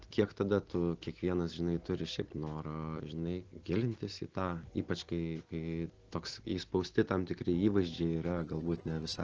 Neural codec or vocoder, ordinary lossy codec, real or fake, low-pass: none; Opus, 16 kbps; real; 7.2 kHz